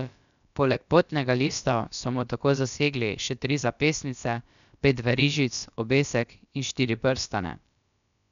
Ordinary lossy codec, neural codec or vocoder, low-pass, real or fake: none; codec, 16 kHz, about 1 kbps, DyCAST, with the encoder's durations; 7.2 kHz; fake